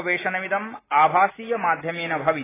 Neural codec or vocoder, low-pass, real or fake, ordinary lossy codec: none; 3.6 kHz; real; AAC, 16 kbps